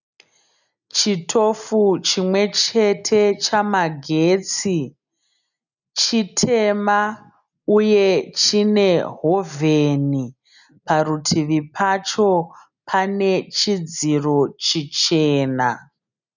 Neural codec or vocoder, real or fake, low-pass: none; real; 7.2 kHz